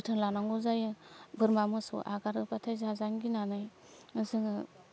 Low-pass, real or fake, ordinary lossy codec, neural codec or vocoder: none; real; none; none